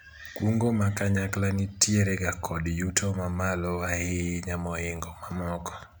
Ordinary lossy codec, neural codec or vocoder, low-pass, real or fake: none; none; none; real